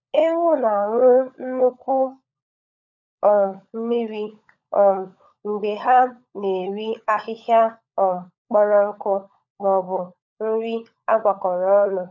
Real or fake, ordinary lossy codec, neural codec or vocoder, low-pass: fake; none; codec, 16 kHz, 16 kbps, FunCodec, trained on LibriTTS, 50 frames a second; 7.2 kHz